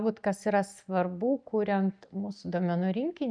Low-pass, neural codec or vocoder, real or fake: 9.9 kHz; none; real